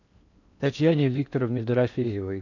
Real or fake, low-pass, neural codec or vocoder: fake; 7.2 kHz; codec, 16 kHz in and 24 kHz out, 0.8 kbps, FocalCodec, streaming, 65536 codes